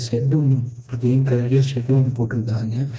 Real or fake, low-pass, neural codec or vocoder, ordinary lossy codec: fake; none; codec, 16 kHz, 1 kbps, FreqCodec, smaller model; none